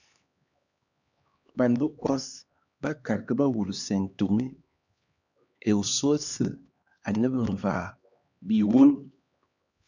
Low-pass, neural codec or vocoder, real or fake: 7.2 kHz; codec, 16 kHz, 2 kbps, X-Codec, HuBERT features, trained on LibriSpeech; fake